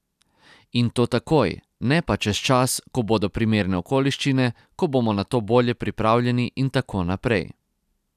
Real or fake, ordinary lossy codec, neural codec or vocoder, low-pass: real; none; none; 14.4 kHz